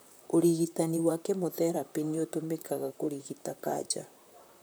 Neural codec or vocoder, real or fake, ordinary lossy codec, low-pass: vocoder, 44.1 kHz, 128 mel bands, Pupu-Vocoder; fake; none; none